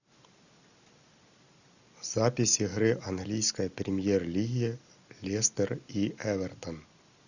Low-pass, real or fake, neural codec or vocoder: 7.2 kHz; real; none